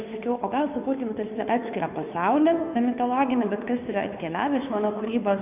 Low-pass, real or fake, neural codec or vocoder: 3.6 kHz; fake; codec, 16 kHz, 2 kbps, FunCodec, trained on Chinese and English, 25 frames a second